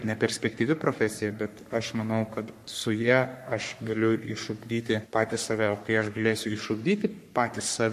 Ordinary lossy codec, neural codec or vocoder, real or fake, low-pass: MP3, 64 kbps; codec, 44.1 kHz, 3.4 kbps, Pupu-Codec; fake; 14.4 kHz